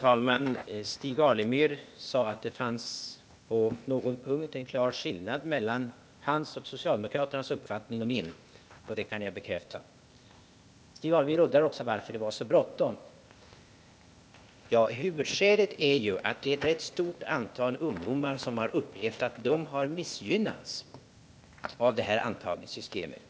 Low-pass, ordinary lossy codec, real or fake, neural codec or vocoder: none; none; fake; codec, 16 kHz, 0.8 kbps, ZipCodec